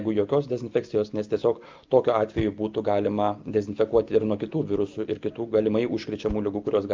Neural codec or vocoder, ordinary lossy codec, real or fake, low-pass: none; Opus, 24 kbps; real; 7.2 kHz